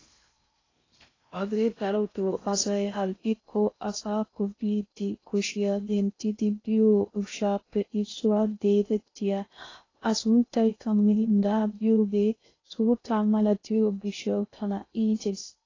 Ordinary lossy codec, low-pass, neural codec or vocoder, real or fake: AAC, 32 kbps; 7.2 kHz; codec, 16 kHz in and 24 kHz out, 0.6 kbps, FocalCodec, streaming, 4096 codes; fake